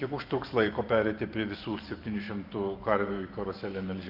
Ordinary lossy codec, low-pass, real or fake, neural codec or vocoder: Opus, 24 kbps; 5.4 kHz; fake; vocoder, 44.1 kHz, 128 mel bands every 512 samples, BigVGAN v2